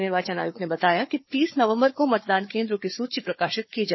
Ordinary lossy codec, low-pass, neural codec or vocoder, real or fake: MP3, 24 kbps; 7.2 kHz; codec, 16 kHz, 4 kbps, FunCodec, trained on Chinese and English, 50 frames a second; fake